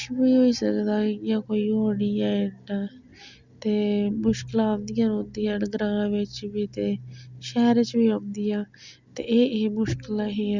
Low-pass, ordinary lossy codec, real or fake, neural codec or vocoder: 7.2 kHz; Opus, 64 kbps; real; none